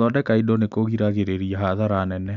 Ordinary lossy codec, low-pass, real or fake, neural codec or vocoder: none; 7.2 kHz; real; none